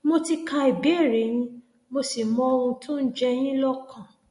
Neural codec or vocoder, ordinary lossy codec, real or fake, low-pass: none; MP3, 48 kbps; real; 10.8 kHz